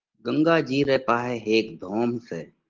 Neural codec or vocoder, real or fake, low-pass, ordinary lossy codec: none; real; 7.2 kHz; Opus, 16 kbps